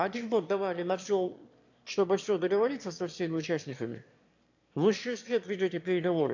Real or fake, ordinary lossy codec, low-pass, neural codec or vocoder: fake; AAC, 48 kbps; 7.2 kHz; autoencoder, 22.05 kHz, a latent of 192 numbers a frame, VITS, trained on one speaker